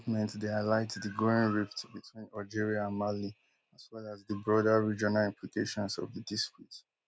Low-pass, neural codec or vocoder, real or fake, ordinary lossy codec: none; none; real; none